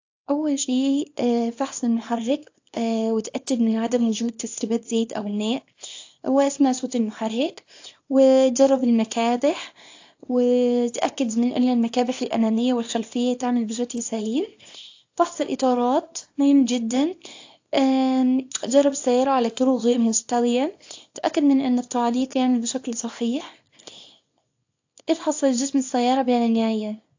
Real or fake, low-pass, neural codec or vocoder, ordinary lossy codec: fake; 7.2 kHz; codec, 24 kHz, 0.9 kbps, WavTokenizer, small release; AAC, 48 kbps